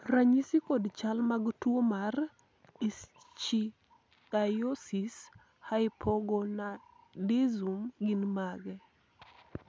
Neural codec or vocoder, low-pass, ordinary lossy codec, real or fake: none; none; none; real